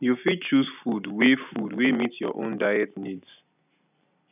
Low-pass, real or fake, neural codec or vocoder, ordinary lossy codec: 3.6 kHz; real; none; none